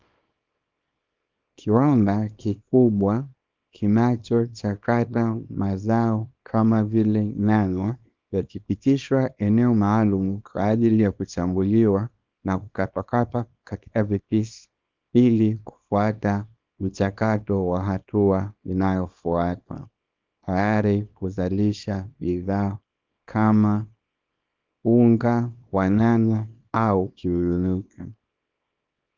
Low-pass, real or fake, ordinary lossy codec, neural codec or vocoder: 7.2 kHz; fake; Opus, 24 kbps; codec, 24 kHz, 0.9 kbps, WavTokenizer, small release